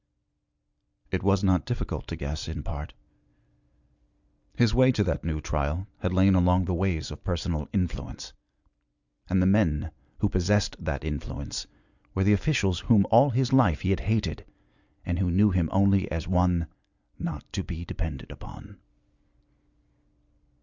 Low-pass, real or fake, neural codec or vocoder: 7.2 kHz; real; none